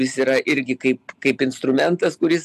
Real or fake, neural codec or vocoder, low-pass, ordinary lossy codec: real; none; 14.4 kHz; AAC, 96 kbps